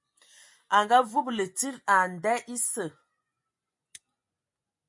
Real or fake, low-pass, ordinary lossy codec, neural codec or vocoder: fake; 10.8 kHz; MP3, 48 kbps; vocoder, 24 kHz, 100 mel bands, Vocos